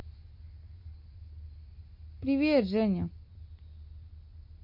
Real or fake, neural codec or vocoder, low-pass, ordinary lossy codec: real; none; 5.4 kHz; MP3, 32 kbps